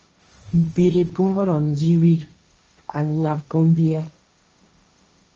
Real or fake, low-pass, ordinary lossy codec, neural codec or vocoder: fake; 7.2 kHz; Opus, 24 kbps; codec, 16 kHz, 1.1 kbps, Voila-Tokenizer